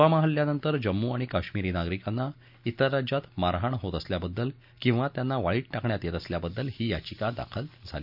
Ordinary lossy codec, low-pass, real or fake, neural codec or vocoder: none; 5.4 kHz; real; none